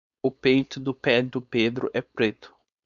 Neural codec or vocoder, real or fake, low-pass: codec, 16 kHz, 4.8 kbps, FACodec; fake; 7.2 kHz